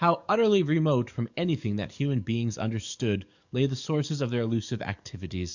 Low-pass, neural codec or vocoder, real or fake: 7.2 kHz; codec, 44.1 kHz, 7.8 kbps, DAC; fake